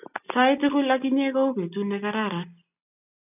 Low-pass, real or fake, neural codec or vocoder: 3.6 kHz; real; none